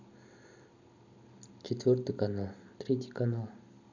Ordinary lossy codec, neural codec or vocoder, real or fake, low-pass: none; none; real; 7.2 kHz